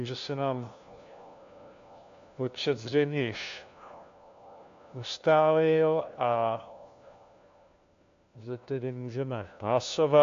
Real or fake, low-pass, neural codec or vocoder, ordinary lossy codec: fake; 7.2 kHz; codec, 16 kHz, 1 kbps, FunCodec, trained on LibriTTS, 50 frames a second; AAC, 64 kbps